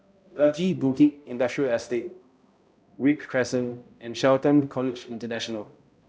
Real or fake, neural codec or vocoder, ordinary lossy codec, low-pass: fake; codec, 16 kHz, 0.5 kbps, X-Codec, HuBERT features, trained on balanced general audio; none; none